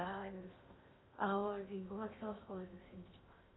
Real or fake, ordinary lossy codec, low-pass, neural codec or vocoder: fake; AAC, 16 kbps; 7.2 kHz; codec, 16 kHz in and 24 kHz out, 0.6 kbps, FocalCodec, streaming, 2048 codes